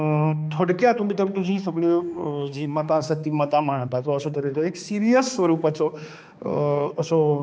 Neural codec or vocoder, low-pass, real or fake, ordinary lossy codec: codec, 16 kHz, 2 kbps, X-Codec, HuBERT features, trained on balanced general audio; none; fake; none